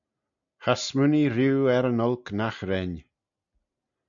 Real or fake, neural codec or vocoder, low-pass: real; none; 7.2 kHz